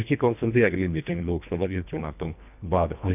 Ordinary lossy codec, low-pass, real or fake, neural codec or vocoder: none; 3.6 kHz; fake; codec, 24 kHz, 1.5 kbps, HILCodec